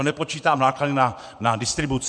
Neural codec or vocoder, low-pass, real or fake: vocoder, 24 kHz, 100 mel bands, Vocos; 9.9 kHz; fake